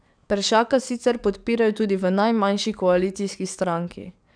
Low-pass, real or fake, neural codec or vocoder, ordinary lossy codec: 9.9 kHz; fake; codec, 44.1 kHz, 7.8 kbps, DAC; none